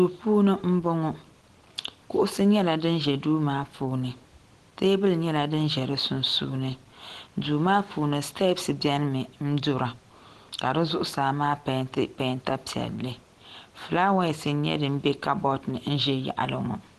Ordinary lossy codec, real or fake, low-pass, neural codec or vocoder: Opus, 16 kbps; real; 10.8 kHz; none